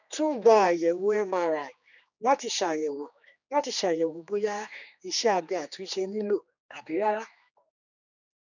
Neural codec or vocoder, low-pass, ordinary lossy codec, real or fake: codec, 16 kHz, 2 kbps, X-Codec, HuBERT features, trained on general audio; 7.2 kHz; none; fake